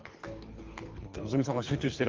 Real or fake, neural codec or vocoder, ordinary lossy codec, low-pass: fake; codec, 24 kHz, 3 kbps, HILCodec; Opus, 24 kbps; 7.2 kHz